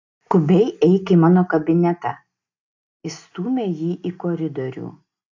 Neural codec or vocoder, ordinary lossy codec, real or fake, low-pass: none; AAC, 48 kbps; real; 7.2 kHz